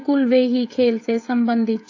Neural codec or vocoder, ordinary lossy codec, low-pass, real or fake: codec, 16 kHz, 16 kbps, FreqCodec, smaller model; AAC, 48 kbps; 7.2 kHz; fake